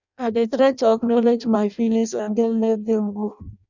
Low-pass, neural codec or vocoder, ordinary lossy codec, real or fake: 7.2 kHz; codec, 16 kHz in and 24 kHz out, 0.6 kbps, FireRedTTS-2 codec; none; fake